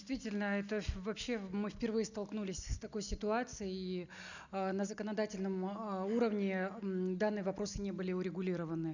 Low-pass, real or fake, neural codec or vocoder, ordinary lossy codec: 7.2 kHz; real; none; none